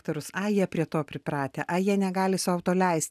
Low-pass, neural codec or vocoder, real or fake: 14.4 kHz; none; real